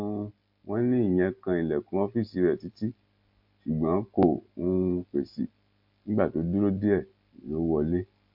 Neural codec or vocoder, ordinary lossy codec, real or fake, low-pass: none; MP3, 48 kbps; real; 5.4 kHz